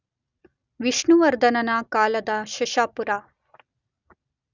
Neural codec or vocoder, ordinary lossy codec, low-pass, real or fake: none; none; 7.2 kHz; real